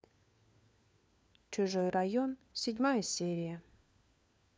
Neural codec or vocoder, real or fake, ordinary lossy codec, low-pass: codec, 16 kHz, 4 kbps, FunCodec, trained on LibriTTS, 50 frames a second; fake; none; none